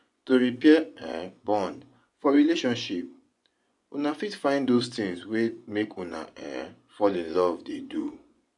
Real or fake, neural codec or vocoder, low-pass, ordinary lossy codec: fake; vocoder, 24 kHz, 100 mel bands, Vocos; 10.8 kHz; none